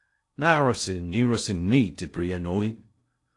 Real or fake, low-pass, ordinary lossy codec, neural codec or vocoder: fake; 10.8 kHz; AAC, 48 kbps; codec, 16 kHz in and 24 kHz out, 0.6 kbps, FocalCodec, streaming, 2048 codes